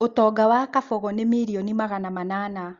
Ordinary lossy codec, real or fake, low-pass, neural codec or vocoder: Opus, 32 kbps; real; 7.2 kHz; none